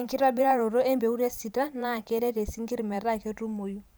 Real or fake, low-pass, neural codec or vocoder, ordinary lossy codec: fake; none; vocoder, 44.1 kHz, 128 mel bands every 512 samples, BigVGAN v2; none